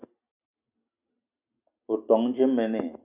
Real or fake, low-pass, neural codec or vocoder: real; 3.6 kHz; none